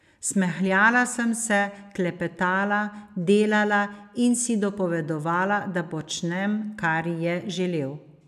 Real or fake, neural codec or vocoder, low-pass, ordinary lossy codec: real; none; 14.4 kHz; none